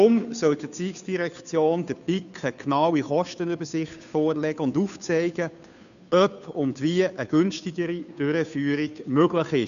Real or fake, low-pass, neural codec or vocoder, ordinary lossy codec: fake; 7.2 kHz; codec, 16 kHz, 2 kbps, FunCodec, trained on Chinese and English, 25 frames a second; none